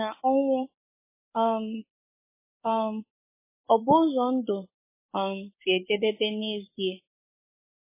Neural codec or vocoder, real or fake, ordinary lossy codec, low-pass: codec, 44.1 kHz, 7.8 kbps, DAC; fake; MP3, 16 kbps; 3.6 kHz